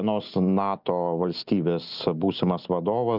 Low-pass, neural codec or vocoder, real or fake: 5.4 kHz; none; real